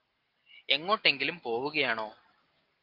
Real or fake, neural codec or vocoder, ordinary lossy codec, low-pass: real; none; Opus, 32 kbps; 5.4 kHz